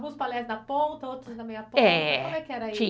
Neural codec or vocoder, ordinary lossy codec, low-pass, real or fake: none; none; none; real